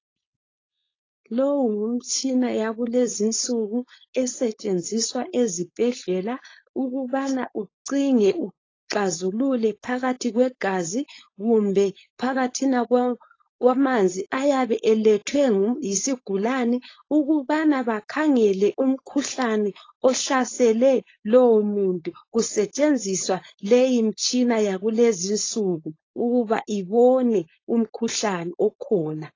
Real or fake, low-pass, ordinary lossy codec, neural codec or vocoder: fake; 7.2 kHz; AAC, 32 kbps; codec, 16 kHz, 4.8 kbps, FACodec